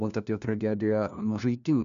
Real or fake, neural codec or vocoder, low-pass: fake; codec, 16 kHz, 1 kbps, FunCodec, trained on LibriTTS, 50 frames a second; 7.2 kHz